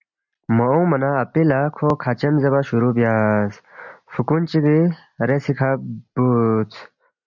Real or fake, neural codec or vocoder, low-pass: real; none; 7.2 kHz